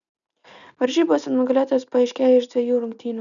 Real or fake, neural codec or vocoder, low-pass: real; none; 7.2 kHz